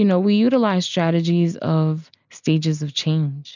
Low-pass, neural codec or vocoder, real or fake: 7.2 kHz; none; real